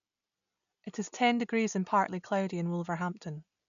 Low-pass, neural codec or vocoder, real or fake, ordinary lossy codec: 7.2 kHz; none; real; none